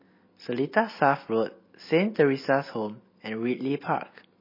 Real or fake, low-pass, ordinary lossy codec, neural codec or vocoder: real; 5.4 kHz; MP3, 24 kbps; none